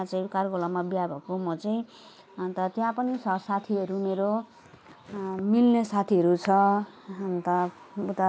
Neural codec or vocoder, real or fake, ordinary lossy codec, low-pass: none; real; none; none